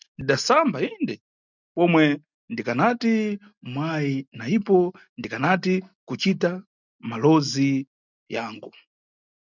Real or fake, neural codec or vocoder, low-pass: real; none; 7.2 kHz